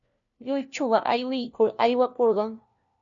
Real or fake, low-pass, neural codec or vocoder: fake; 7.2 kHz; codec, 16 kHz, 1 kbps, FunCodec, trained on LibriTTS, 50 frames a second